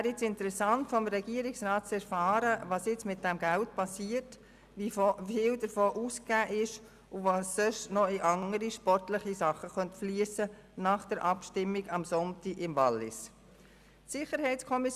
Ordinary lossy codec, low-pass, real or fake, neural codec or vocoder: Opus, 64 kbps; 14.4 kHz; real; none